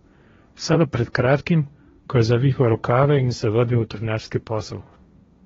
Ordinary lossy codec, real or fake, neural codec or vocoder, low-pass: AAC, 24 kbps; fake; codec, 16 kHz, 1.1 kbps, Voila-Tokenizer; 7.2 kHz